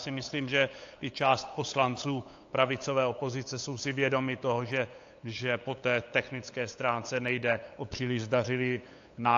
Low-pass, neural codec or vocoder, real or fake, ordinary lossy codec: 7.2 kHz; codec, 16 kHz, 8 kbps, FunCodec, trained on LibriTTS, 25 frames a second; fake; AAC, 48 kbps